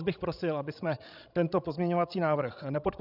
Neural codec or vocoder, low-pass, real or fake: codec, 16 kHz, 16 kbps, FreqCodec, larger model; 5.4 kHz; fake